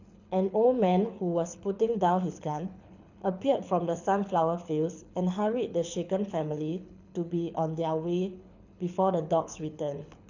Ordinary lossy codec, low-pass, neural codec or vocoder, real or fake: none; 7.2 kHz; codec, 24 kHz, 6 kbps, HILCodec; fake